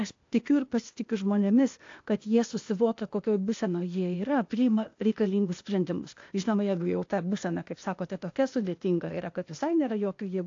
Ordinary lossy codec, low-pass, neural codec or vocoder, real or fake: AAC, 48 kbps; 7.2 kHz; codec, 16 kHz, 0.8 kbps, ZipCodec; fake